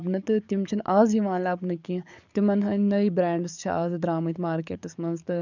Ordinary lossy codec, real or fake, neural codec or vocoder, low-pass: none; fake; codec, 44.1 kHz, 7.8 kbps, DAC; 7.2 kHz